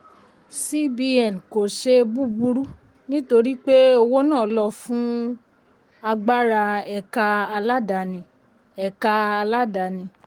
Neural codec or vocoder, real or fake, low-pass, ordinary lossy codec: codec, 44.1 kHz, 7.8 kbps, Pupu-Codec; fake; 19.8 kHz; Opus, 24 kbps